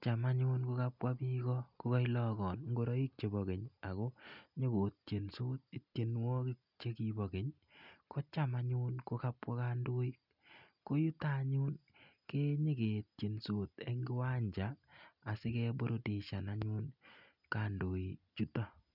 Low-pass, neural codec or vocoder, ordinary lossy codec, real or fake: 5.4 kHz; none; none; real